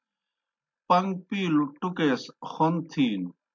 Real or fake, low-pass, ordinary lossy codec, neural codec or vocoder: real; 7.2 kHz; MP3, 48 kbps; none